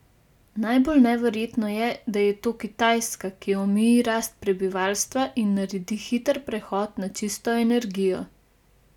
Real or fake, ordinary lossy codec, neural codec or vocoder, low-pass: real; none; none; 19.8 kHz